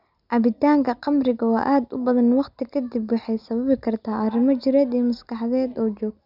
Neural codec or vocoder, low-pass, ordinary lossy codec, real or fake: none; 5.4 kHz; none; real